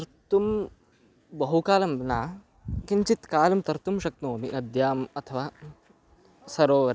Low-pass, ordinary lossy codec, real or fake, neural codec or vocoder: none; none; real; none